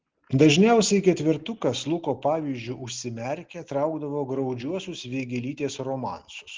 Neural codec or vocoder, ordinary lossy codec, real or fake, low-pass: none; Opus, 16 kbps; real; 7.2 kHz